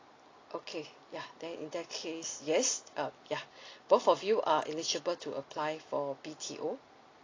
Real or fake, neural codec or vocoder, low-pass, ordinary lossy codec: real; none; 7.2 kHz; AAC, 32 kbps